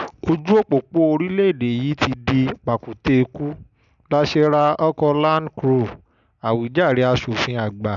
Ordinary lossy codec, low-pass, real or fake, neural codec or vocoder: none; 7.2 kHz; real; none